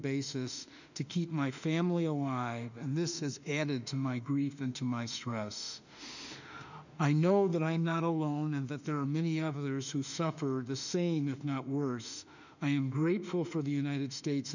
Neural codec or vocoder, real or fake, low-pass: autoencoder, 48 kHz, 32 numbers a frame, DAC-VAE, trained on Japanese speech; fake; 7.2 kHz